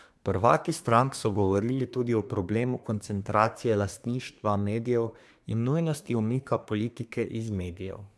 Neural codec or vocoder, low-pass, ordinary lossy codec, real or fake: codec, 24 kHz, 1 kbps, SNAC; none; none; fake